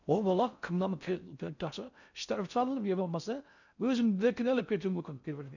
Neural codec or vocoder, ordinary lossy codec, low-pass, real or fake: codec, 16 kHz in and 24 kHz out, 0.6 kbps, FocalCodec, streaming, 4096 codes; none; 7.2 kHz; fake